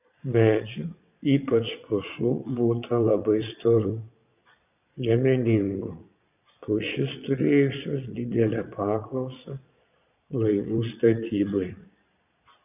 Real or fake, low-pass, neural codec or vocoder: fake; 3.6 kHz; vocoder, 22.05 kHz, 80 mel bands, Vocos